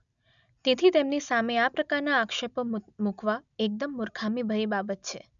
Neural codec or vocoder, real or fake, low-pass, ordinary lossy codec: none; real; 7.2 kHz; none